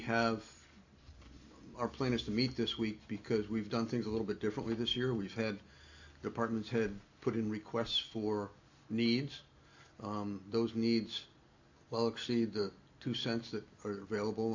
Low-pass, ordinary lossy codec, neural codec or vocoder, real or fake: 7.2 kHz; AAC, 48 kbps; none; real